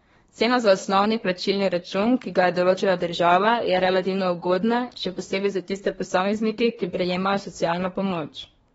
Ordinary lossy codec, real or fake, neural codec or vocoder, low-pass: AAC, 24 kbps; fake; codec, 24 kHz, 1 kbps, SNAC; 10.8 kHz